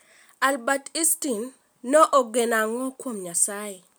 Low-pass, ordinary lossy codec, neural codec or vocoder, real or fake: none; none; none; real